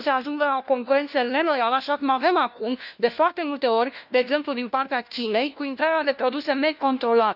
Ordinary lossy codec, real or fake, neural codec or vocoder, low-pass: none; fake; codec, 16 kHz, 1 kbps, FunCodec, trained on LibriTTS, 50 frames a second; 5.4 kHz